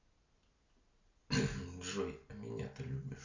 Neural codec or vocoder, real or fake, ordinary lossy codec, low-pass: none; real; Opus, 64 kbps; 7.2 kHz